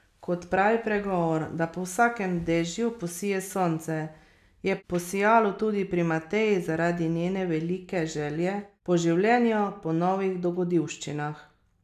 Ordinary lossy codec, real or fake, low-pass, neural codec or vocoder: none; real; 14.4 kHz; none